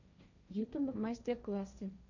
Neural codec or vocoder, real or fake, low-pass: codec, 16 kHz, 1.1 kbps, Voila-Tokenizer; fake; 7.2 kHz